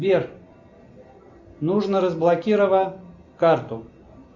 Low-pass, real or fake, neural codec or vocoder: 7.2 kHz; real; none